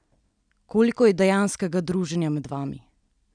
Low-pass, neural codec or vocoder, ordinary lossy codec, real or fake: 9.9 kHz; none; none; real